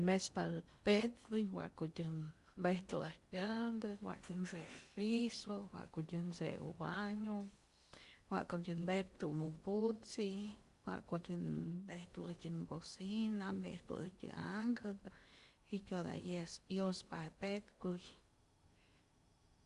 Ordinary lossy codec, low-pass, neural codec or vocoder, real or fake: Opus, 64 kbps; 10.8 kHz; codec, 16 kHz in and 24 kHz out, 0.8 kbps, FocalCodec, streaming, 65536 codes; fake